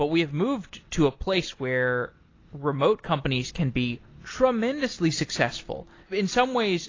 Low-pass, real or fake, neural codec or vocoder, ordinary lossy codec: 7.2 kHz; real; none; AAC, 32 kbps